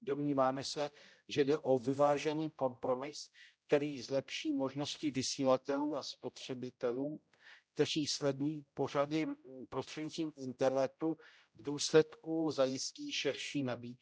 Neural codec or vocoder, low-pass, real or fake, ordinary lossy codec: codec, 16 kHz, 0.5 kbps, X-Codec, HuBERT features, trained on general audio; none; fake; none